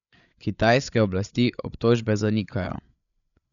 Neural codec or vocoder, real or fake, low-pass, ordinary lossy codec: codec, 16 kHz, 8 kbps, FreqCodec, larger model; fake; 7.2 kHz; none